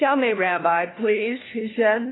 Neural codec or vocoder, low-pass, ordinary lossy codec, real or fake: codec, 16 kHz, 1 kbps, FunCodec, trained on LibriTTS, 50 frames a second; 7.2 kHz; AAC, 16 kbps; fake